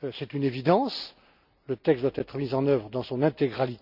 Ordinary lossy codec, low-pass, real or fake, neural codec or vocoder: none; 5.4 kHz; real; none